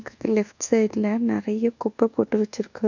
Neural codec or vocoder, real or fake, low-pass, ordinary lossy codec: codec, 24 kHz, 1.2 kbps, DualCodec; fake; 7.2 kHz; none